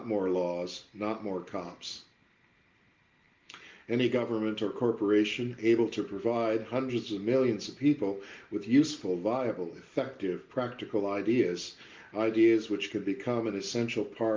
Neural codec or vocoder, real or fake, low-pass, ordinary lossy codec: none; real; 7.2 kHz; Opus, 32 kbps